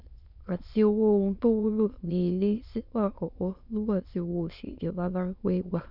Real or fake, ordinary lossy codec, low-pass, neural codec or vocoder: fake; none; 5.4 kHz; autoencoder, 22.05 kHz, a latent of 192 numbers a frame, VITS, trained on many speakers